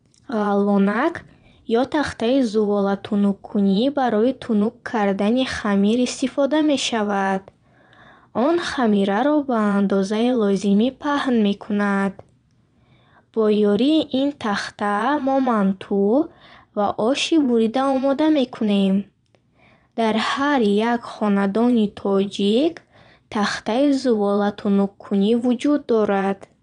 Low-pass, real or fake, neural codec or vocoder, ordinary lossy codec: 9.9 kHz; fake; vocoder, 22.05 kHz, 80 mel bands, Vocos; none